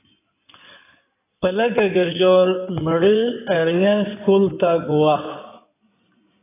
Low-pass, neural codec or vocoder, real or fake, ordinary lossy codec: 3.6 kHz; codec, 16 kHz in and 24 kHz out, 2.2 kbps, FireRedTTS-2 codec; fake; AAC, 24 kbps